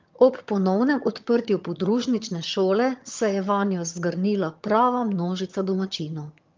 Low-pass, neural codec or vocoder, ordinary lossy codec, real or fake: 7.2 kHz; vocoder, 22.05 kHz, 80 mel bands, HiFi-GAN; Opus, 24 kbps; fake